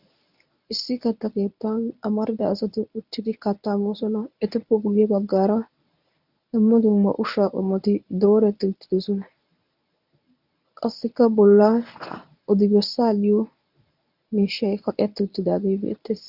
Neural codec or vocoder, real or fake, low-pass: codec, 24 kHz, 0.9 kbps, WavTokenizer, medium speech release version 1; fake; 5.4 kHz